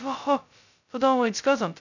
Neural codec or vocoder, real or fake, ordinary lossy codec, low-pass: codec, 16 kHz, 0.2 kbps, FocalCodec; fake; none; 7.2 kHz